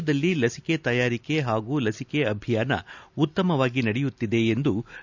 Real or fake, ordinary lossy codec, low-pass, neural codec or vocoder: real; none; 7.2 kHz; none